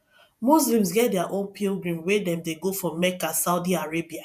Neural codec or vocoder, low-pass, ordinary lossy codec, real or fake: vocoder, 48 kHz, 128 mel bands, Vocos; 14.4 kHz; none; fake